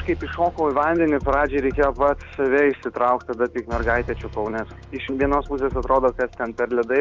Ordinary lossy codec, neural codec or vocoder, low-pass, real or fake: Opus, 32 kbps; none; 7.2 kHz; real